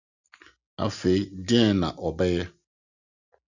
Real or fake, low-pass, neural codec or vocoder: real; 7.2 kHz; none